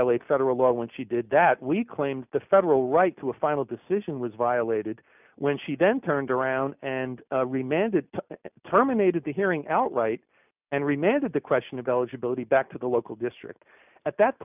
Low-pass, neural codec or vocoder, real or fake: 3.6 kHz; none; real